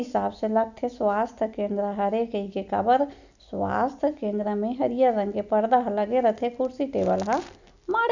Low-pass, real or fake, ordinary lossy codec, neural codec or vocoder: 7.2 kHz; real; none; none